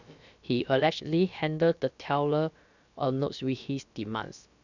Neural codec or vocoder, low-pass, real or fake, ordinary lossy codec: codec, 16 kHz, about 1 kbps, DyCAST, with the encoder's durations; 7.2 kHz; fake; none